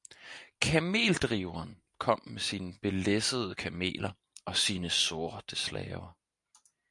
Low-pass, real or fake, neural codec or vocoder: 10.8 kHz; real; none